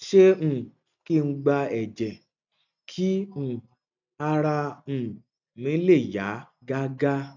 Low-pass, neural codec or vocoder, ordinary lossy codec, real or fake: 7.2 kHz; none; none; real